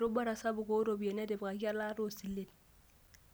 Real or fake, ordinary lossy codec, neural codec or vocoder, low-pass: real; none; none; none